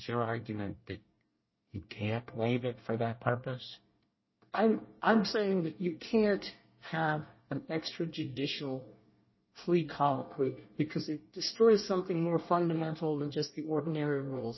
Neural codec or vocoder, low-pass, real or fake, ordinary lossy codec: codec, 24 kHz, 1 kbps, SNAC; 7.2 kHz; fake; MP3, 24 kbps